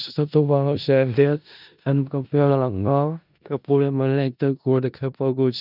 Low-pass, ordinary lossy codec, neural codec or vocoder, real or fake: 5.4 kHz; none; codec, 16 kHz in and 24 kHz out, 0.4 kbps, LongCat-Audio-Codec, four codebook decoder; fake